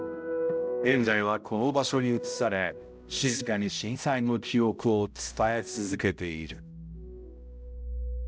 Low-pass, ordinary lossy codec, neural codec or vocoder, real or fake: none; none; codec, 16 kHz, 0.5 kbps, X-Codec, HuBERT features, trained on balanced general audio; fake